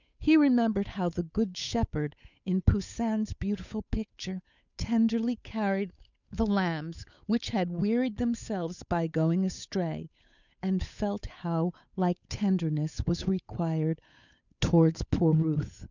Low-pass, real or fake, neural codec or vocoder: 7.2 kHz; fake; codec, 16 kHz, 8 kbps, FunCodec, trained on Chinese and English, 25 frames a second